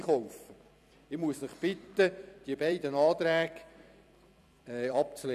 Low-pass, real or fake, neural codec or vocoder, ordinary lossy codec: 14.4 kHz; fake; vocoder, 44.1 kHz, 128 mel bands every 256 samples, BigVGAN v2; none